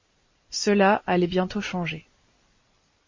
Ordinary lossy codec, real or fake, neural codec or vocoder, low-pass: MP3, 32 kbps; real; none; 7.2 kHz